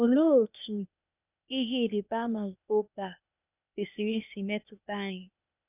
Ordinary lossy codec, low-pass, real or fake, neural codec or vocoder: none; 3.6 kHz; fake; codec, 16 kHz, 0.8 kbps, ZipCodec